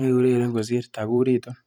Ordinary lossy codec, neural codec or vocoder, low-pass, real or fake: none; vocoder, 44.1 kHz, 128 mel bands every 512 samples, BigVGAN v2; 19.8 kHz; fake